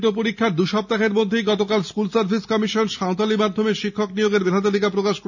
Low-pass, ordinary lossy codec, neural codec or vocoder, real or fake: 7.2 kHz; none; none; real